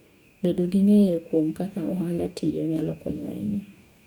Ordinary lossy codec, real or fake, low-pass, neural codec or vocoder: none; fake; 19.8 kHz; codec, 44.1 kHz, 2.6 kbps, DAC